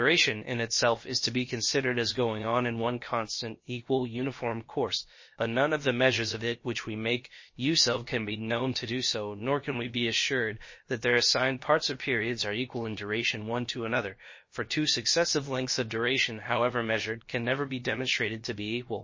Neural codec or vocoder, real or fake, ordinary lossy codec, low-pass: codec, 16 kHz, about 1 kbps, DyCAST, with the encoder's durations; fake; MP3, 32 kbps; 7.2 kHz